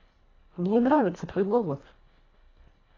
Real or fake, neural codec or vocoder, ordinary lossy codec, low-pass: fake; codec, 24 kHz, 1.5 kbps, HILCodec; AAC, 32 kbps; 7.2 kHz